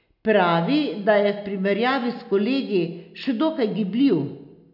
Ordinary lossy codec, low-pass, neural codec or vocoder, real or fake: none; 5.4 kHz; none; real